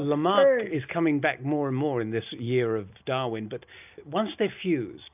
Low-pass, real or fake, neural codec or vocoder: 3.6 kHz; real; none